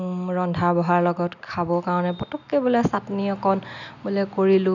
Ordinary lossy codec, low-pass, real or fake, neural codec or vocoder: none; 7.2 kHz; real; none